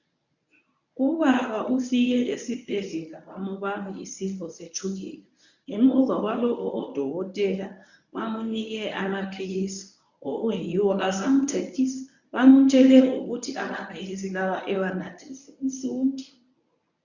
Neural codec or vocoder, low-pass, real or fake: codec, 24 kHz, 0.9 kbps, WavTokenizer, medium speech release version 1; 7.2 kHz; fake